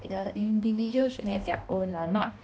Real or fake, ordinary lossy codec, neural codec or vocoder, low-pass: fake; none; codec, 16 kHz, 1 kbps, X-Codec, HuBERT features, trained on balanced general audio; none